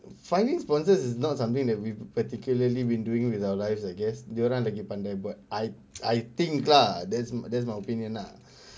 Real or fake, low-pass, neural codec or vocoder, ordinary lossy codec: real; none; none; none